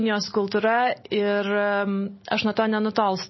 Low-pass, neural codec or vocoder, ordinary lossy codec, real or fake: 7.2 kHz; none; MP3, 24 kbps; real